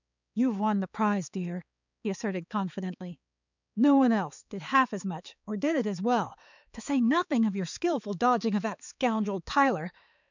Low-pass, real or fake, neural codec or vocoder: 7.2 kHz; fake; codec, 16 kHz, 4 kbps, X-Codec, HuBERT features, trained on balanced general audio